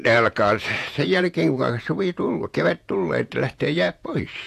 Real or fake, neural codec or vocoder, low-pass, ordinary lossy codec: fake; vocoder, 48 kHz, 128 mel bands, Vocos; 14.4 kHz; AAC, 64 kbps